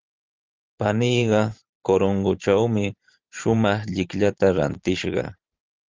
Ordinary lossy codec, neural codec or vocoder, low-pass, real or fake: Opus, 32 kbps; vocoder, 44.1 kHz, 128 mel bands every 512 samples, BigVGAN v2; 7.2 kHz; fake